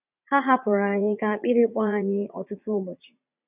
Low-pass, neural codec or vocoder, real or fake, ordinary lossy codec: 3.6 kHz; vocoder, 44.1 kHz, 80 mel bands, Vocos; fake; none